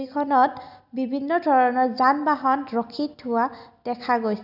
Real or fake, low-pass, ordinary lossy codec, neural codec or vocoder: real; 5.4 kHz; none; none